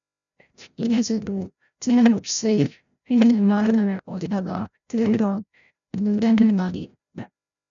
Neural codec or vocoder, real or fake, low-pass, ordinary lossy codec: codec, 16 kHz, 0.5 kbps, FreqCodec, larger model; fake; 7.2 kHz; MP3, 96 kbps